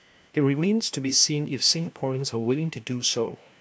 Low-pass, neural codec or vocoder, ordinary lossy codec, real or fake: none; codec, 16 kHz, 1 kbps, FunCodec, trained on LibriTTS, 50 frames a second; none; fake